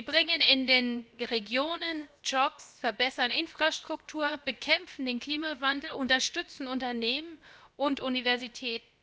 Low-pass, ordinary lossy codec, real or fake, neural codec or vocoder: none; none; fake; codec, 16 kHz, about 1 kbps, DyCAST, with the encoder's durations